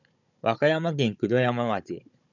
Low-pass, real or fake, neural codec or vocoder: 7.2 kHz; fake; codec, 16 kHz, 16 kbps, FunCodec, trained on Chinese and English, 50 frames a second